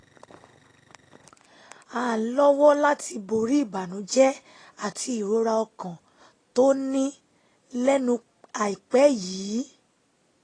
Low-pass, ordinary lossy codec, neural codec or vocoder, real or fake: 9.9 kHz; AAC, 32 kbps; none; real